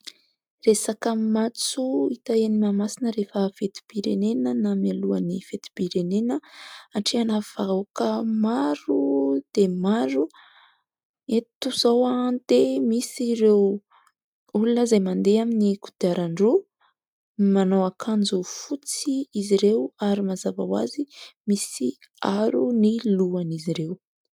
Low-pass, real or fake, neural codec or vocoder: 19.8 kHz; real; none